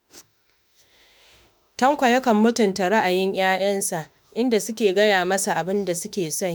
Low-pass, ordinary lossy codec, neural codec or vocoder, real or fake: none; none; autoencoder, 48 kHz, 32 numbers a frame, DAC-VAE, trained on Japanese speech; fake